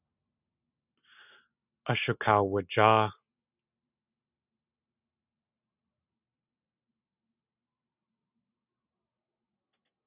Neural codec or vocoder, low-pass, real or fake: none; 3.6 kHz; real